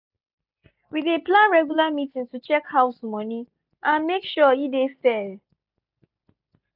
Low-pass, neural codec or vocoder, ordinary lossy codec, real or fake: 5.4 kHz; none; none; real